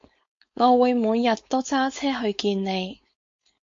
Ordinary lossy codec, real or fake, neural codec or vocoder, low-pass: MP3, 48 kbps; fake; codec, 16 kHz, 4.8 kbps, FACodec; 7.2 kHz